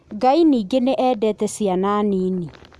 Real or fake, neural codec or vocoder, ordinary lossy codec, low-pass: real; none; none; none